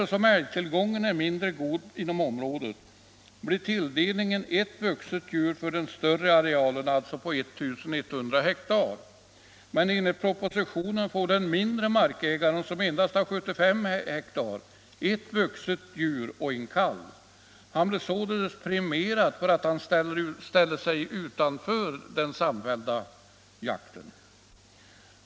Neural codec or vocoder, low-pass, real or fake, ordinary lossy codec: none; none; real; none